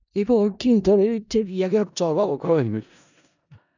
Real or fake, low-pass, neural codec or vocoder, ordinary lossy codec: fake; 7.2 kHz; codec, 16 kHz in and 24 kHz out, 0.4 kbps, LongCat-Audio-Codec, four codebook decoder; none